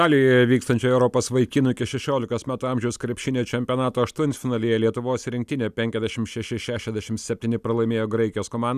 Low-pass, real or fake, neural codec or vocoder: 14.4 kHz; real; none